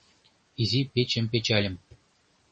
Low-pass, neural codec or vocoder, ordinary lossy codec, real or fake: 9.9 kHz; none; MP3, 32 kbps; real